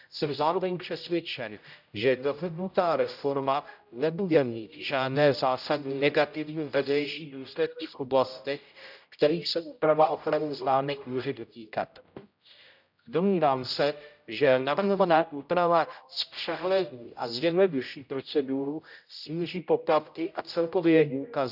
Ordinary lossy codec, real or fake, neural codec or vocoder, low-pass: none; fake; codec, 16 kHz, 0.5 kbps, X-Codec, HuBERT features, trained on general audio; 5.4 kHz